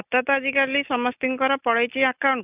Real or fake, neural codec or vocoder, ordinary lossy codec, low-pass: real; none; none; 3.6 kHz